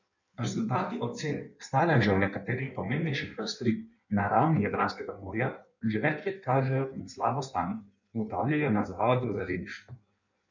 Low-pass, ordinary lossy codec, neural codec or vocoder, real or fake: 7.2 kHz; none; codec, 16 kHz in and 24 kHz out, 1.1 kbps, FireRedTTS-2 codec; fake